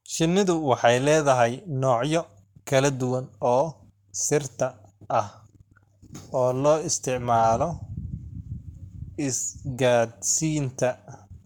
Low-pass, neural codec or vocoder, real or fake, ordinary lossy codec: 19.8 kHz; codec, 44.1 kHz, 7.8 kbps, Pupu-Codec; fake; none